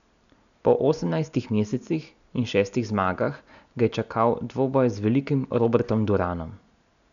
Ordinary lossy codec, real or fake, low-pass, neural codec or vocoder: none; real; 7.2 kHz; none